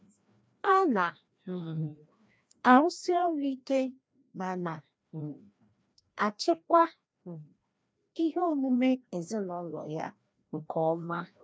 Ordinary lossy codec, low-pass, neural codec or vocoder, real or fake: none; none; codec, 16 kHz, 1 kbps, FreqCodec, larger model; fake